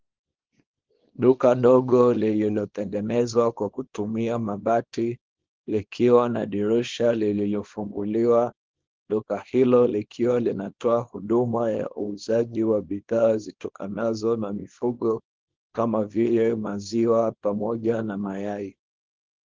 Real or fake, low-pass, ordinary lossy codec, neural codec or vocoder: fake; 7.2 kHz; Opus, 16 kbps; codec, 24 kHz, 0.9 kbps, WavTokenizer, small release